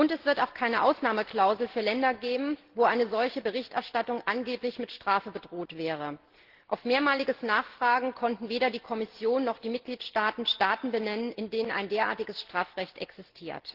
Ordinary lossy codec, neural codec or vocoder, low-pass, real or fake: Opus, 16 kbps; none; 5.4 kHz; real